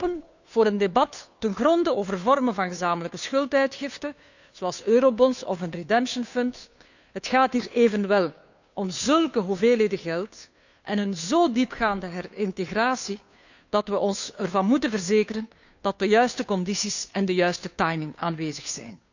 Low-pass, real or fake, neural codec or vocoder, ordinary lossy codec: 7.2 kHz; fake; codec, 16 kHz, 2 kbps, FunCodec, trained on Chinese and English, 25 frames a second; none